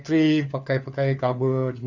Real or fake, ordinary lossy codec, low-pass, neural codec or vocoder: fake; none; 7.2 kHz; codec, 16 kHz, 4 kbps, FunCodec, trained on LibriTTS, 50 frames a second